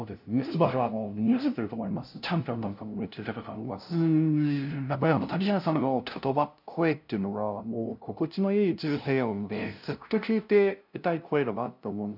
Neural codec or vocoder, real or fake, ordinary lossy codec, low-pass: codec, 16 kHz, 0.5 kbps, FunCodec, trained on LibriTTS, 25 frames a second; fake; none; 5.4 kHz